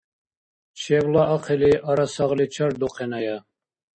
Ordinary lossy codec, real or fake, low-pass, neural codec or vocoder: MP3, 32 kbps; real; 9.9 kHz; none